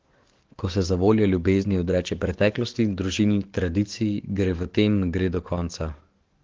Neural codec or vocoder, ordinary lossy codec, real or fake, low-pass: codec, 44.1 kHz, 7.8 kbps, DAC; Opus, 16 kbps; fake; 7.2 kHz